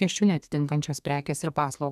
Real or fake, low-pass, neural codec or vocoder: fake; 14.4 kHz; codec, 32 kHz, 1.9 kbps, SNAC